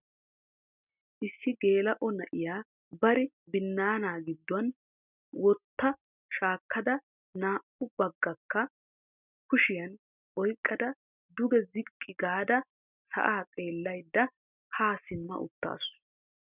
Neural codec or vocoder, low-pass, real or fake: none; 3.6 kHz; real